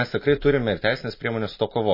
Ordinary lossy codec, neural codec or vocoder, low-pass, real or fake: MP3, 24 kbps; none; 5.4 kHz; real